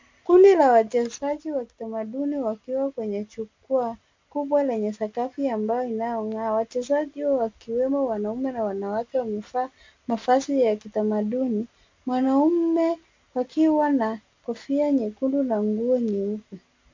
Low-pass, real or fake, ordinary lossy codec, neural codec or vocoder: 7.2 kHz; real; MP3, 64 kbps; none